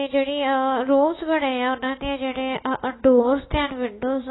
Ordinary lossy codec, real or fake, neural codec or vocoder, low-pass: AAC, 16 kbps; fake; autoencoder, 48 kHz, 128 numbers a frame, DAC-VAE, trained on Japanese speech; 7.2 kHz